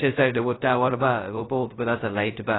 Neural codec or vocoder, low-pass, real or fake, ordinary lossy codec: codec, 16 kHz, 0.2 kbps, FocalCodec; 7.2 kHz; fake; AAC, 16 kbps